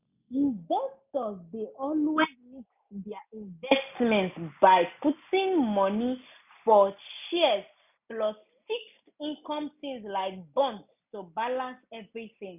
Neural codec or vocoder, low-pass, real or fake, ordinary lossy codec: none; 3.6 kHz; real; none